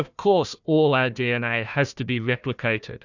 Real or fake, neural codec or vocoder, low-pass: fake; codec, 16 kHz, 1 kbps, FunCodec, trained on Chinese and English, 50 frames a second; 7.2 kHz